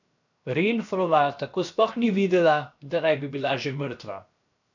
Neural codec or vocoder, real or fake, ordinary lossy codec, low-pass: codec, 16 kHz, 0.7 kbps, FocalCodec; fake; none; 7.2 kHz